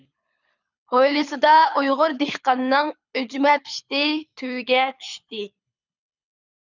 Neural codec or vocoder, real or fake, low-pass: codec, 24 kHz, 6 kbps, HILCodec; fake; 7.2 kHz